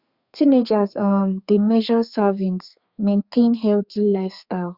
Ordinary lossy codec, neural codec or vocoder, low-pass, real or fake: Opus, 64 kbps; codec, 32 kHz, 1.9 kbps, SNAC; 5.4 kHz; fake